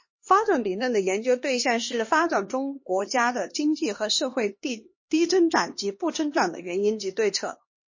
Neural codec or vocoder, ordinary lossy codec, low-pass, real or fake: codec, 16 kHz, 2 kbps, X-Codec, HuBERT features, trained on LibriSpeech; MP3, 32 kbps; 7.2 kHz; fake